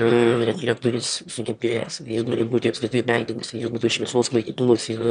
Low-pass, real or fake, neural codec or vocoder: 9.9 kHz; fake; autoencoder, 22.05 kHz, a latent of 192 numbers a frame, VITS, trained on one speaker